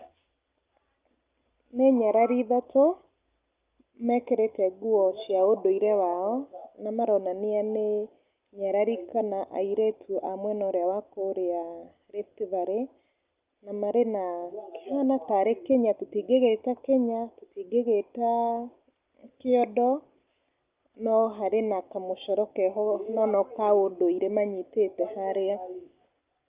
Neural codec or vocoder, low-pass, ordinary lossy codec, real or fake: none; 3.6 kHz; Opus, 24 kbps; real